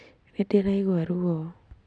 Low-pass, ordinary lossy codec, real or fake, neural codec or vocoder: 9.9 kHz; none; real; none